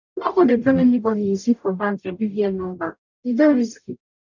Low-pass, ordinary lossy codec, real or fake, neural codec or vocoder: 7.2 kHz; none; fake; codec, 44.1 kHz, 0.9 kbps, DAC